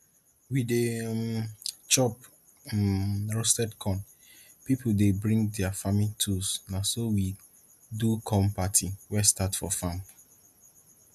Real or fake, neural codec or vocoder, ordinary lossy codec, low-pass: real; none; none; 14.4 kHz